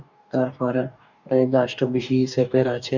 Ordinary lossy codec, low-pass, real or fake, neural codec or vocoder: none; 7.2 kHz; fake; codec, 44.1 kHz, 2.6 kbps, DAC